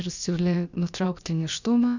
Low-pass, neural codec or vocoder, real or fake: 7.2 kHz; codec, 16 kHz, about 1 kbps, DyCAST, with the encoder's durations; fake